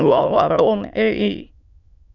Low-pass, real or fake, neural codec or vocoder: 7.2 kHz; fake; autoencoder, 22.05 kHz, a latent of 192 numbers a frame, VITS, trained on many speakers